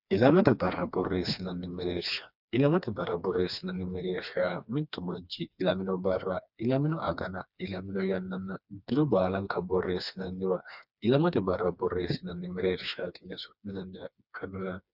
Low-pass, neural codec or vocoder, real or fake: 5.4 kHz; codec, 16 kHz, 2 kbps, FreqCodec, smaller model; fake